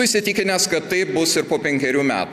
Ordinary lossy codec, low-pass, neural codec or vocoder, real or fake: AAC, 96 kbps; 14.4 kHz; none; real